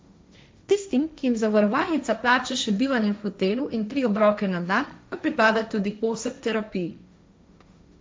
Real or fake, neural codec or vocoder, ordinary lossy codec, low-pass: fake; codec, 16 kHz, 1.1 kbps, Voila-Tokenizer; none; none